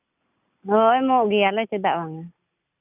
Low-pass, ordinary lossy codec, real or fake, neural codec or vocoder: 3.6 kHz; none; real; none